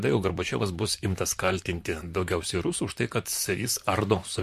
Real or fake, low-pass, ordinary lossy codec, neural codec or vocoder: fake; 14.4 kHz; MP3, 64 kbps; vocoder, 44.1 kHz, 128 mel bands, Pupu-Vocoder